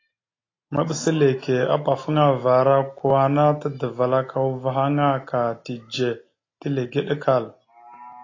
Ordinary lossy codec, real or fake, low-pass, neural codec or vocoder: AAC, 32 kbps; real; 7.2 kHz; none